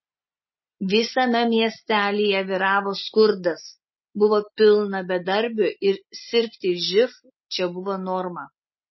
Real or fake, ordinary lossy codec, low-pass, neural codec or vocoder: real; MP3, 24 kbps; 7.2 kHz; none